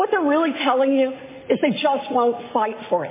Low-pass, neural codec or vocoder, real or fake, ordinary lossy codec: 3.6 kHz; none; real; MP3, 16 kbps